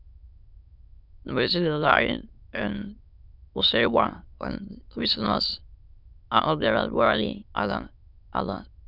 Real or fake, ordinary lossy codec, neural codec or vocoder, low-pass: fake; none; autoencoder, 22.05 kHz, a latent of 192 numbers a frame, VITS, trained on many speakers; 5.4 kHz